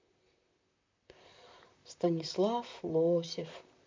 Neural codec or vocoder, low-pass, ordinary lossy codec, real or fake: vocoder, 44.1 kHz, 128 mel bands, Pupu-Vocoder; 7.2 kHz; MP3, 48 kbps; fake